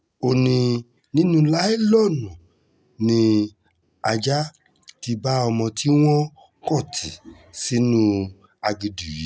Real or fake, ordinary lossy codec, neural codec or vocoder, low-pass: real; none; none; none